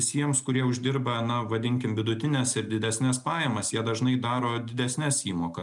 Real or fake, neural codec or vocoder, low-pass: real; none; 10.8 kHz